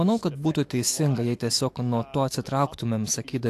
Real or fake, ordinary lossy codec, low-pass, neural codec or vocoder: fake; AAC, 64 kbps; 14.4 kHz; autoencoder, 48 kHz, 128 numbers a frame, DAC-VAE, trained on Japanese speech